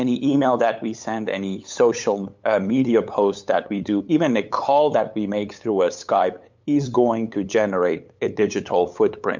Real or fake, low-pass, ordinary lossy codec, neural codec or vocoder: fake; 7.2 kHz; MP3, 64 kbps; codec, 16 kHz, 8 kbps, FunCodec, trained on LibriTTS, 25 frames a second